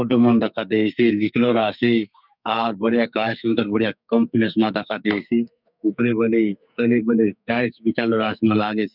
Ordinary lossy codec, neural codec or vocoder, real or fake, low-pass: none; codec, 44.1 kHz, 2.6 kbps, SNAC; fake; 5.4 kHz